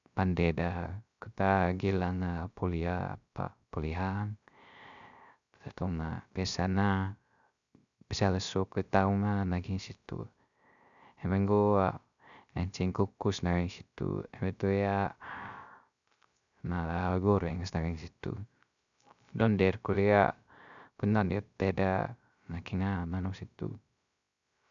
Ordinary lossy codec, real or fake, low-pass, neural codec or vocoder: none; fake; 7.2 kHz; codec, 16 kHz, 0.3 kbps, FocalCodec